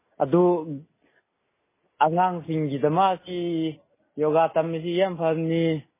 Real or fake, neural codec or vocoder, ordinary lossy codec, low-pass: real; none; MP3, 16 kbps; 3.6 kHz